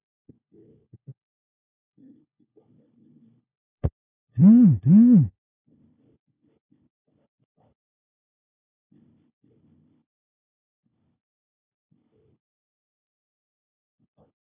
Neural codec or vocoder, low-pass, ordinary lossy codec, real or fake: codec, 16 kHz, 1 kbps, FunCodec, trained on LibriTTS, 50 frames a second; 3.6 kHz; none; fake